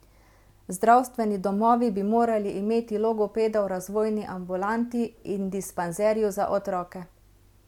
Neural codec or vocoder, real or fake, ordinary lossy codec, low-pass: none; real; MP3, 96 kbps; 19.8 kHz